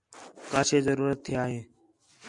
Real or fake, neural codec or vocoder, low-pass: real; none; 10.8 kHz